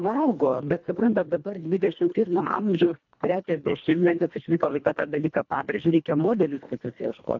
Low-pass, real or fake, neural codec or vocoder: 7.2 kHz; fake; codec, 24 kHz, 1.5 kbps, HILCodec